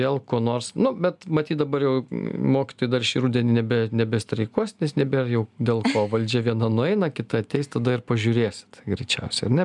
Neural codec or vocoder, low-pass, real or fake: none; 10.8 kHz; real